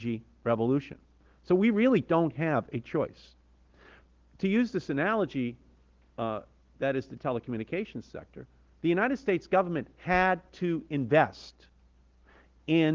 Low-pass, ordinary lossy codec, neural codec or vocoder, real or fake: 7.2 kHz; Opus, 16 kbps; none; real